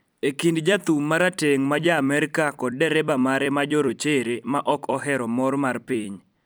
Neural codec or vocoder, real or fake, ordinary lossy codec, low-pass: vocoder, 44.1 kHz, 128 mel bands every 256 samples, BigVGAN v2; fake; none; none